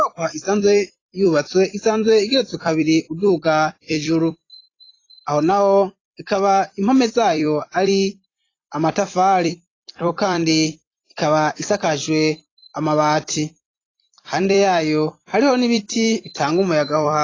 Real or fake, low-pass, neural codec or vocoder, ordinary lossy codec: fake; 7.2 kHz; vocoder, 44.1 kHz, 128 mel bands every 256 samples, BigVGAN v2; AAC, 32 kbps